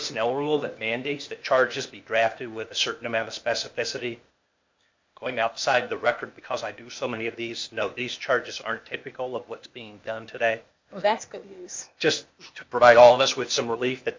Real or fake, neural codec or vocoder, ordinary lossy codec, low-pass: fake; codec, 16 kHz, 0.8 kbps, ZipCodec; MP3, 64 kbps; 7.2 kHz